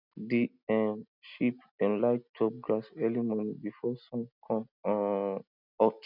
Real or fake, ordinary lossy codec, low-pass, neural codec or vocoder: real; none; 5.4 kHz; none